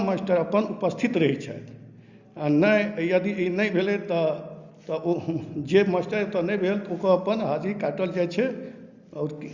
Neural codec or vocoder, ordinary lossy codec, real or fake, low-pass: none; Opus, 64 kbps; real; 7.2 kHz